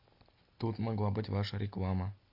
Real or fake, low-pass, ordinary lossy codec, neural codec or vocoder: real; 5.4 kHz; AAC, 48 kbps; none